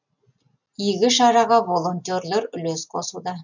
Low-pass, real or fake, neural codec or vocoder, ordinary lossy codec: 7.2 kHz; real; none; none